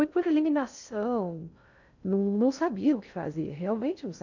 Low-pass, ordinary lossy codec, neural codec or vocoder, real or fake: 7.2 kHz; none; codec, 16 kHz in and 24 kHz out, 0.6 kbps, FocalCodec, streaming, 2048 codes; fake